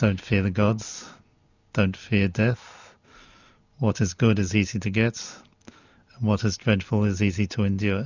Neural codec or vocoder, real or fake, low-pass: none; real; 7.2 kHz